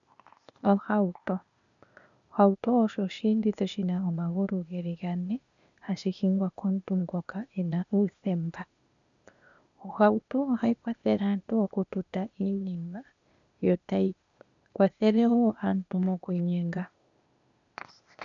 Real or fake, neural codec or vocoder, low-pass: fake; codec, 16 kHz, 0.8 kbps, ZipCodec; 7.2 kHz